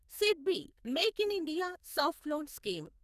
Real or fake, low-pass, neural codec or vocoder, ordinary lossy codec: fake; 14.4 kHz; codec, 44.1 kHz, 2.6 kbps, SNAC; none